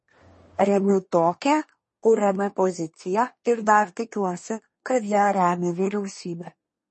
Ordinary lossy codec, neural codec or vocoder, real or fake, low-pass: MP3, 32 kbps; codec, 24 kHz, 1 kbps, SNAC; fake; 10.8 kHz